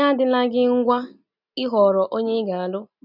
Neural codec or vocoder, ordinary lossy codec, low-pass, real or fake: none; none; 5.4 kHz; real